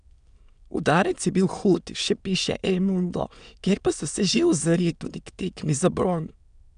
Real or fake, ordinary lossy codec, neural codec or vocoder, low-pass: fake; none; autoencoder, 22.05 kHz, a latent of 192 numbers a frame, VITS, trained on many speakers; 9.9 kHz